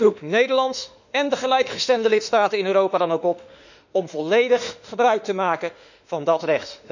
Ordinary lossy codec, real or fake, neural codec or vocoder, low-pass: none; fake; autoencoder, 48 kHz, 32 numbers a frame, DAC-VAE, trained on Japanese speech; 7.2 kHz